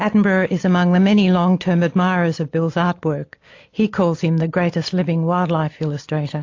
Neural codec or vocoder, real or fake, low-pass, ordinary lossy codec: none; real; 7.2 kHz; AAC, 48 kbps